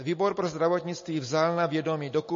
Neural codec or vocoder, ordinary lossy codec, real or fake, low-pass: none; MP3, 32 kbps; real; 7.2 kHz